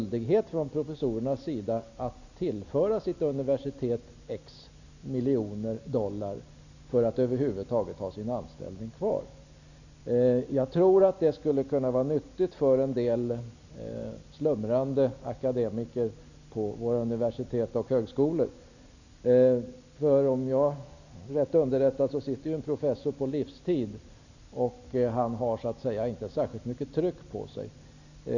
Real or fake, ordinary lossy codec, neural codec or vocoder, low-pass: real; none; none; 7.2 kHz